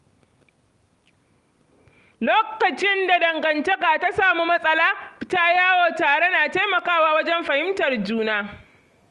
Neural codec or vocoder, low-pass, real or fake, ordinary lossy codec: none; 10.8 kHz; real; Opus, 32 kbps